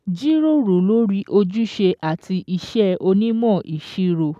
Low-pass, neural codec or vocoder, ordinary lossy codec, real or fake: 14.4 kHz; none; none; real